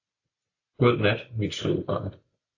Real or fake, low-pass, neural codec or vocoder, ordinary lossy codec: real; 7.2 kHz; none; AAC, 48 kbps